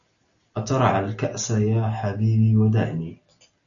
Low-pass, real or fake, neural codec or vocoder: 7.2 kHz; real; none